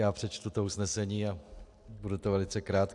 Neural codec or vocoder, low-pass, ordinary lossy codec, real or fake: none; 10.8 kHz; AAC, 64 kbps; real